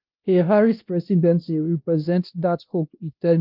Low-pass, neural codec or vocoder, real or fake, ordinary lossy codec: 5.4 kHz; codec, 16 kHz, 1 kbps, X-Codec, WavLM features, trained on Multilingual LibriSpeech; fake; Opus, 32 kbps